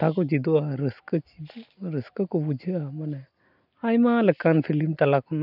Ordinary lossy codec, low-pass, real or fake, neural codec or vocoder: none; 5.4 kHz; real; none